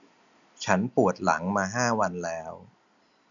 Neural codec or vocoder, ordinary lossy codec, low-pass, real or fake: none; none; 7.2 kHz; real